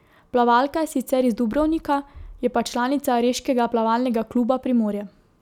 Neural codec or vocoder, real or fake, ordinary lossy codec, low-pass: none; real; none; 19.8 kHz